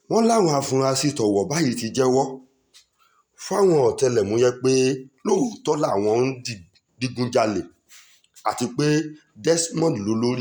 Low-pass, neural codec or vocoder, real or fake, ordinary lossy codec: none; none; real; none